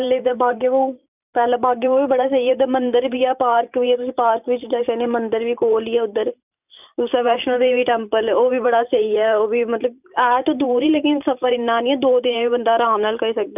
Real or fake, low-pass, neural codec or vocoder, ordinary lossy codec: fake; 3.6 kHz; vocoder, 44.1 kHz, 128 mel bands every 512 samples, BigVGAN v2; none